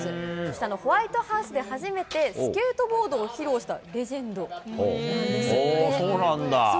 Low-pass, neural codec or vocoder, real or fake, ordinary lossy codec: none; none; real; none